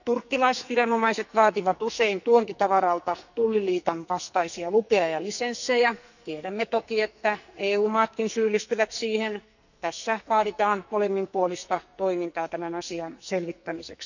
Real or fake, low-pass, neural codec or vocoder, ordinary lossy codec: fake; 7.2 kHz; codec, 44.1 kHz, 2.6 kbps, SNAC; none